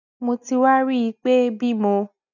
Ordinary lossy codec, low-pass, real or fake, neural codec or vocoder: none; 7.2 kHz; real; none